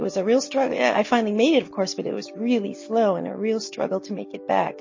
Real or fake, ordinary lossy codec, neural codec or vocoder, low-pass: real; MP3, 32 kbps; none; 7.2 kHz